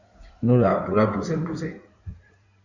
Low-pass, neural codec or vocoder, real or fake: 7.2 kHz; codec, 16 kHz in and 24 kHz out, 2.2 kbps, FireRedTTS-2 codec; fake